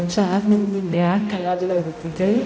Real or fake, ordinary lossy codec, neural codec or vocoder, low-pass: fake; none; codec, 16 kHz, 0.5 kbps, X-Codec, HuBERT features, trained on balanced general audio; none